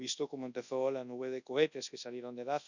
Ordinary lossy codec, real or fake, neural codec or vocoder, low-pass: none; fake; codec, 24 kHz, 0.9 kbps, WavTokenizer, large speech release; 7.2 kHz